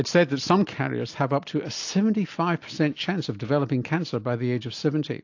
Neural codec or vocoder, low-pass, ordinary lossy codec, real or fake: none; 7.2 kHz; AAC, 48 kbps; real